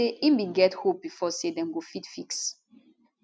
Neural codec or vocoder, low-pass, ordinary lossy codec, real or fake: none; none; none; real